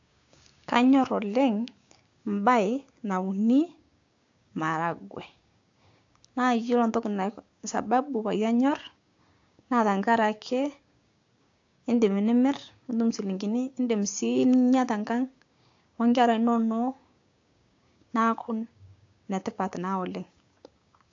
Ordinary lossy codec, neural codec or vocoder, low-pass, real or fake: AAC, 48 kbps; codec, 16 kHz, 6 kbps, DAC; 7.2 kHz; fake